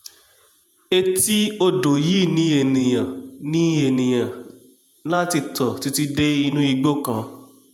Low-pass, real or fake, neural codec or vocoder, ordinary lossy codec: 19.8 kHz; fake; vocoder, 48 kHz, 128 mel bands, Vocos; none